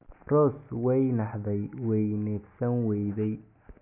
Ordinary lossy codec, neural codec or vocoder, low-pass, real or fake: none; none; 3.6 kHz; real